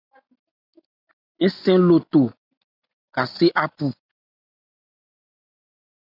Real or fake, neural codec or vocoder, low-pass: real; none; 5.4 kHz